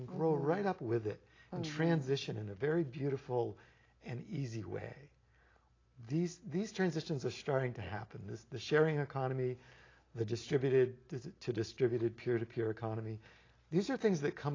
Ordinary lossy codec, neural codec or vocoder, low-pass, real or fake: AAC, 32 kbps; none; 7.2 kHz; real